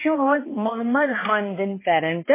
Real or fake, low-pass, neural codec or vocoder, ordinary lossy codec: fake; 3.6 kHz; codec, 16 kHz, 2 kbps, X-Codec, HuBERT features, trained on balanced general audio; MP3, 16 kbps